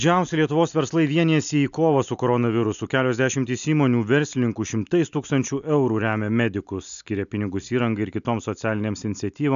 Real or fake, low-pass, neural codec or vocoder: real; 7.2 kHz; none